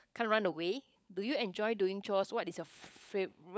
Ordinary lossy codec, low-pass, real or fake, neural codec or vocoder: none; none; fake; codec, 16 kHz, 16 kbps, FunCodec, trained on LibriTTS, 50 frames a second